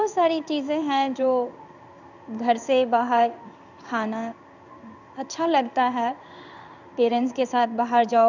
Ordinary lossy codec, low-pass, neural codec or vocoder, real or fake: none; 7.2 kHz; codec, 16 kHz in and 24 kHz out, 1 kbps, XY-Tokenizer; fake